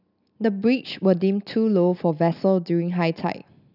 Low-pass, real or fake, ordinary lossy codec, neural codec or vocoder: 5.4 kHz; real; none; none